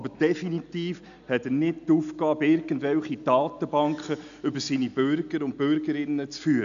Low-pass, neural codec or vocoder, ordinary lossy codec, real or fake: 7.2 kHz; none; AAC, 96 kbps; real